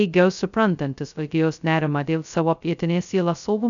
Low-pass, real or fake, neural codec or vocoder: 7.2 kHz; fake; codec, 16 kHz, 0.2 kbps, FocalCodec